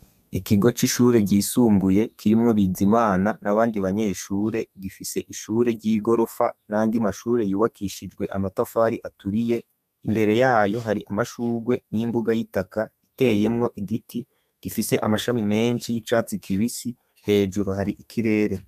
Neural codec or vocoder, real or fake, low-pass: codec, 32 kHz, 1.9 kbps, SNAC; fake; 14.4 kHz